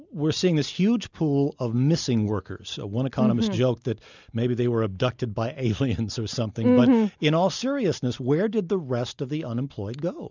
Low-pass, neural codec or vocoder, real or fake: 7.2 kHz; none; real